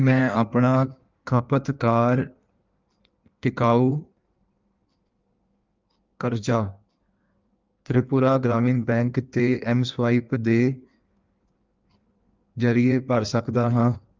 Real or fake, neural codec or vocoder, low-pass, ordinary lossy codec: fake; codec, 16 kHz in and 24 kHz out, 1.1 kbps, FireRedTTS-2 codec; 7.2 kHz; Opus, 24 kbps